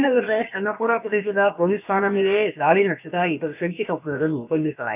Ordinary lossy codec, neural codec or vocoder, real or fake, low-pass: none; codec, 16 kHz, about 1 kbps, DyCAST, with the encoder's durations; fake; 3.6 kHz